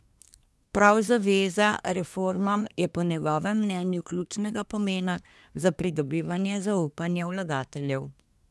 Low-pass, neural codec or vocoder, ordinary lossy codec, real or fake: none; codec, 24 kHz, 1 kbps, SNAC; none; fake